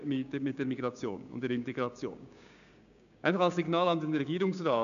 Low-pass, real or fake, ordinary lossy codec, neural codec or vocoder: 7.2 kHz; fake; none; codec, 16 kHz, 6 kbps, DAC